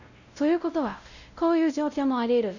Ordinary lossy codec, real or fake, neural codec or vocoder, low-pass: none; fake; codec, 16 kHz, 0.5 kbps, X-Codec, WavLM features, trained on Multilingual LibriSpeech; 7.2 kHz